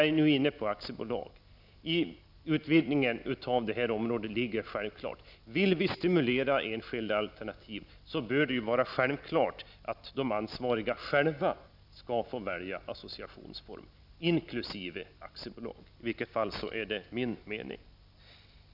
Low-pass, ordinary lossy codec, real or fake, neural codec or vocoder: 5.4 kHz; none; real; none